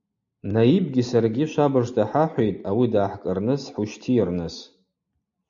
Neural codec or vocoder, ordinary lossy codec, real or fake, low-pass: none; AAC, 64 kbps; real; 7.2 kHz